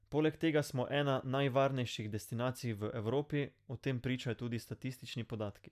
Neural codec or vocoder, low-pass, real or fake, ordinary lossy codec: none; 14.4 kHz; real; none